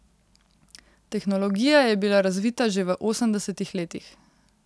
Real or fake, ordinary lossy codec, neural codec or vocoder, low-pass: real; none; none; none